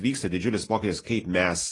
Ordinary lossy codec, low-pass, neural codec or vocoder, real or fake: AAC, 32 kbps; 10.8 kHz; none; real